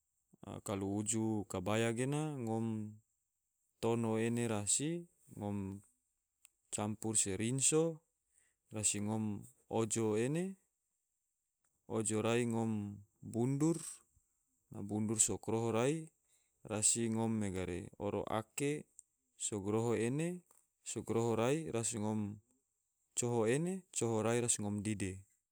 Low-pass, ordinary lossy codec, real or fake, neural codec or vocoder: none; none; real; none